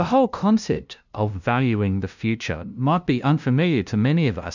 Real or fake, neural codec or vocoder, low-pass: fake; codec, 16 kHz, 0.5 kbps, FunCodec, trained on LibriTTS, 25 frames a second; 7.2 kHz